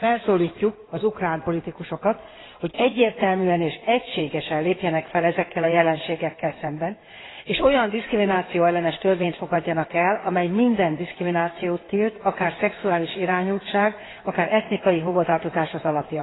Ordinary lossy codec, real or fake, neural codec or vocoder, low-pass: AAC, 16 kbps; fake; codec, 16 kHz in and 24 kHz out, 2.2 kbps, FireRedTTS-2 codec; 7.2 kHz